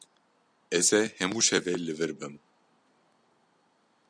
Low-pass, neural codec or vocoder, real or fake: 9.9 kHz; none; real